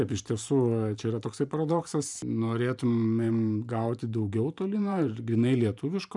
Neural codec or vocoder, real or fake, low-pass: vocoder, 44.1 kHz, 128 mel bands every 512 samples, BigVGAN v2; fake; 10.8 kHz